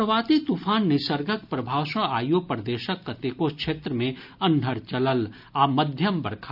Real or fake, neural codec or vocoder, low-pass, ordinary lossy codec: real; none; 5.4 kHz; none